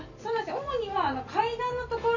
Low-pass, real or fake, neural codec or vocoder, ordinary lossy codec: 7.2 kHz; real; none; none